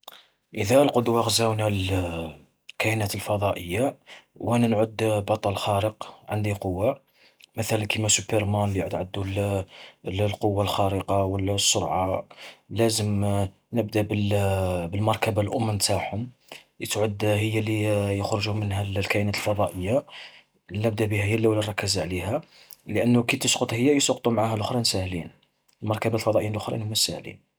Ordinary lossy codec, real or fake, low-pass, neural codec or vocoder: none; real; none; none